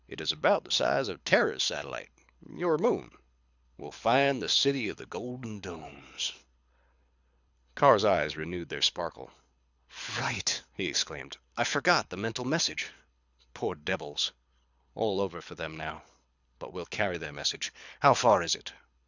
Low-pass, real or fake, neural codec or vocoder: 7.2 kHz; fake; codec, 24 kHz, 6 kbps, HILCodec